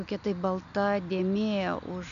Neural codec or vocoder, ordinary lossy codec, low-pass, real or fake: none; AAC, 96 kbps; 7.2 kHz; real